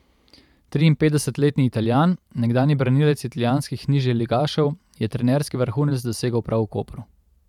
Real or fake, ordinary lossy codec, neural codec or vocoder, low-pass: fake; none; vocoder, 44.1 kHz, 128 mel bands every 512 samples, BigVGAN v2; 19.8 kHz